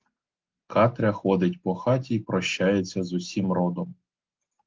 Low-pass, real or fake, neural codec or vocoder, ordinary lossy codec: 7.2 kHz; real; none; Opus, 16 kbps